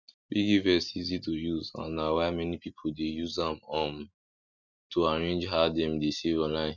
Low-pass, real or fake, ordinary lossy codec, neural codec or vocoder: 7.2 kHz; real; none; none